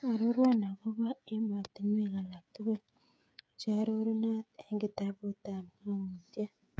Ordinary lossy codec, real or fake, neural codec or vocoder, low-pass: none; fake; codec, 16 kHz, 16 kbps, FreqCodec, smaller model; none